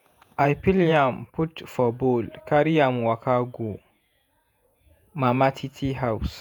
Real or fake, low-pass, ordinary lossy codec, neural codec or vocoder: fake; none; none; vocoder, 48 kHz, 128 mel bands, Vocos